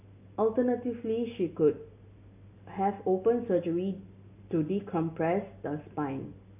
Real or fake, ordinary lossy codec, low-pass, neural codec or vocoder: real; AAC, 32 kbps; 3.6 kHz; none